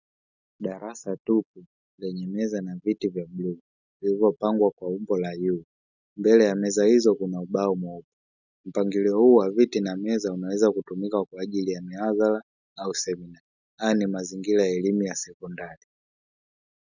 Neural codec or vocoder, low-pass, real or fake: none; 7.2 kHz; real